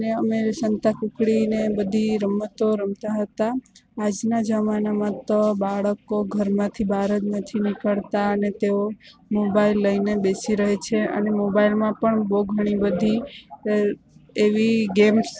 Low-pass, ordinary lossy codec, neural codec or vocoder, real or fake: none; none; none; real